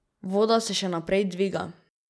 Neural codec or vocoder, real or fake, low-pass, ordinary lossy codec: none; real; none; none